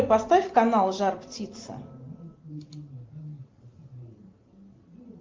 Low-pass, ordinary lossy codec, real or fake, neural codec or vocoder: 7.2 kHz; Opus, 32 kbps; real; none